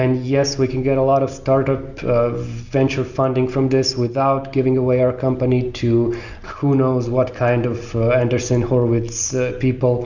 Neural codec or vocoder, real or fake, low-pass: none; real; 7.2 kHz